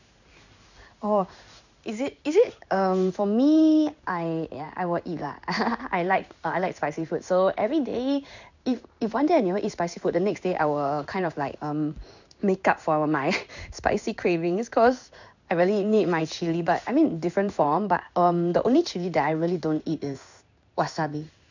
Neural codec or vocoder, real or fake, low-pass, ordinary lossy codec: codec, 16 kHz in and 24 kHz out, 1 kbps, XY-Tokenizer; fake; 7.2 kHz; none